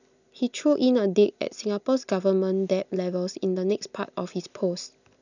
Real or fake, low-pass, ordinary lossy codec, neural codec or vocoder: real; 7.2 kHz; none; none